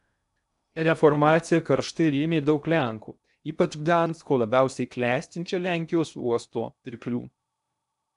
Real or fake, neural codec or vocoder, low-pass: fake; codec, 16 kHz in and 24 kHz out, 0.6 kbps, FocalCodec, streaming, 2048 codes; 10.8 kHz